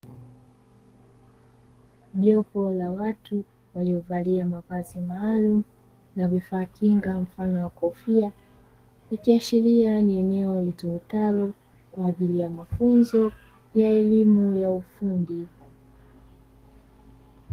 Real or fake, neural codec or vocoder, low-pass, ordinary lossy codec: fake; codec, 32 kHz, 1.9 kbps, SNAC; 14.4 kHz; Opus, 24 kbps